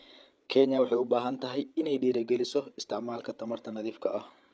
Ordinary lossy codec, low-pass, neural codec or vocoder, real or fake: none; none; codec, 16 kHz, 8 kbps, FreqCodec, larger model; fake